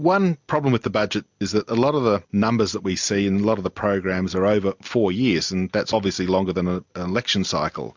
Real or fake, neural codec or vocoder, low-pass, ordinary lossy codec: real; none; 7.2 kHz; MP3, 64 kbps